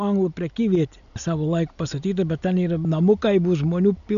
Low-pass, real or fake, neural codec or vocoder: 7.2 kHz; real; none